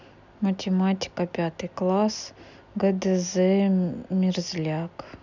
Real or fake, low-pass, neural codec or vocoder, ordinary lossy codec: real; 7.2 kHz; none; none